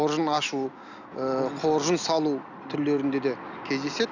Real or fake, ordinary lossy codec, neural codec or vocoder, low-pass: real; none; none; 7.2 kHz